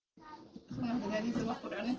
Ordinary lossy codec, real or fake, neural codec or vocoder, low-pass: Opus, 16 kbps; real; none; 7.2 kHz